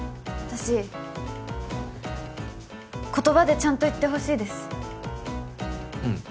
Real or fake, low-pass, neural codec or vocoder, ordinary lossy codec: real; none; none; none